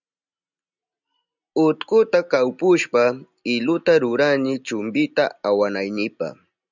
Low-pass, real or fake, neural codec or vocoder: 7.2 kHz; real; none